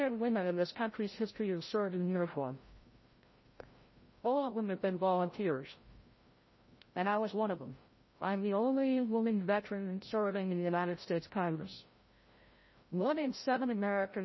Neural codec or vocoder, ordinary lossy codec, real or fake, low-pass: codec, 16 kHz, 0.5 kbps, FreqCodec, larger model; MP3, 24 kbps; fake; 7.2 kHz